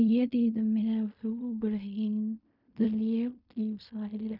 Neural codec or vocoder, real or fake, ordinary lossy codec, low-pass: codec, 16 kHz in and 24 kHz out, 0.4 kbps, LongCat-Audio-Codec, fine tuned four codebook decoder; fake; none; 5.4 kHz